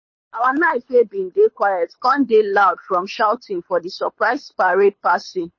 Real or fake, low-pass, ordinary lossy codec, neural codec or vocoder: fake; 7.2 kHz; MP3, 32 kbps; codec, 24 kHz, 6 kbps, HILCodec